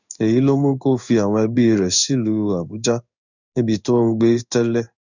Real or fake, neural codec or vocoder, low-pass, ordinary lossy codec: fake; codec, 16 kHz in and 24 kHz out, 1 kbps, XY-Tokenizer; 7.2 kHz; none